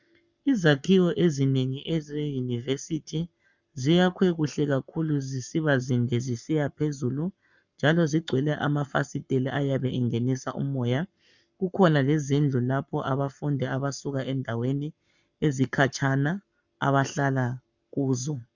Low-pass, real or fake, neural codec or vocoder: 7.2 kHz; fake; codec, 44.1 kHz, 7.8 kbps, Pupu-Codec